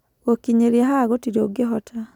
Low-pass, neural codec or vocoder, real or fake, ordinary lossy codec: 19.8 kHz; none; real; none